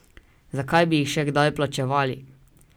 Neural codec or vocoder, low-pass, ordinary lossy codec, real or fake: none; none; none; real